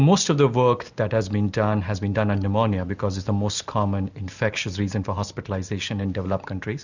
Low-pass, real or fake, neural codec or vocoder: 7.2 kHz; real; none